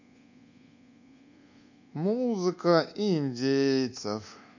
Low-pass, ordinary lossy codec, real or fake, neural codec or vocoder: 7.2 kHz; none; fake; codec, 24 kHz, 1.2 kbps, DualCodec